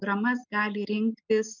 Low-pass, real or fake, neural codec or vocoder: 7.2 kHz; real; none